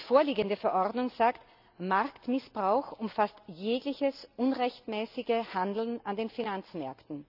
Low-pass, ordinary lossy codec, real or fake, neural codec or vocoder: 5.4 kHz; none; real; none